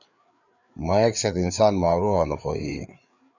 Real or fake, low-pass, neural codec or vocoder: fake; 7.2 kHz; codec, 16 kHz, 4 kbps, FreqCodec, larger model